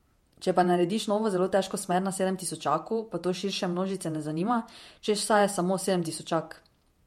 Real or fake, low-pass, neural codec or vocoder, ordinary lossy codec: fake; 19.8 kHz; vocoder, 48 kHz, 128 mel bands, Vocos; MP3, 64 kbps